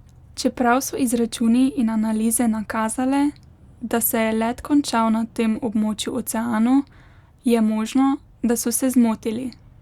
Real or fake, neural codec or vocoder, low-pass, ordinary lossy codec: real; none; 19.8 kHz; Opus, 64 kbps